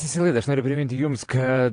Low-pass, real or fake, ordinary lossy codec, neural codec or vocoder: 9.9 kHz; fake; AAC, 48 kbps; vocoder, 22.05 kHz, 80 mel bands, WaveNeXt